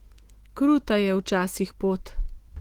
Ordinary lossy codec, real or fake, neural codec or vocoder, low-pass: Opus, 16 kbps; fake; autoencoder, 48 kHz, 128 numbers a frame, DAC-VAE, trained on Japanese speech; 19.8 kHz